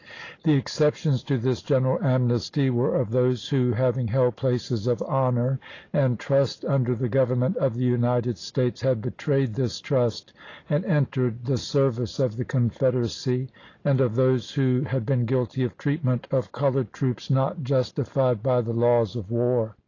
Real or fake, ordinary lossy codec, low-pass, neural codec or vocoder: real; AAC, 32 kbps; 7.2 kHz; none